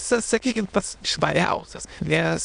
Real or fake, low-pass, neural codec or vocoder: fake; 9.9 kHz; autoencoder, 22.05 kHz, a latent of 192 numbers a frame, VITS, trained on many speakers